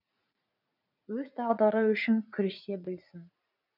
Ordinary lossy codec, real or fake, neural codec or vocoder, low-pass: none; fake; vocoder, 44.1 kHz, 80 mel bands, Vocos; 5.4 kHz